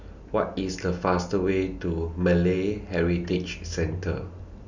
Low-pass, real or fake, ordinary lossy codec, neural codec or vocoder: 7.2 kHz; real; none; none